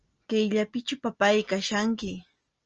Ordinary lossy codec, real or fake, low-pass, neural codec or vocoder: Opus, 24 kbps; real; 7.2 kHz; none